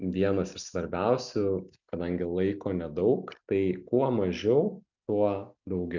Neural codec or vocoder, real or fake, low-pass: none; real; 7.2 kHz